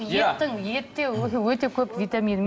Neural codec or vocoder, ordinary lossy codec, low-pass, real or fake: none; none; none; real